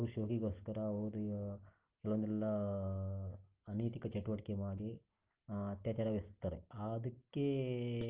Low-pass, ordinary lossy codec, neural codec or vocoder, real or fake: 3.6 kHz; Opus, 16 kbps; none; real